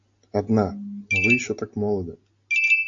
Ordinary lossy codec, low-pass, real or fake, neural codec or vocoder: AAC, 48 kbps; 7.2 kHz; real; none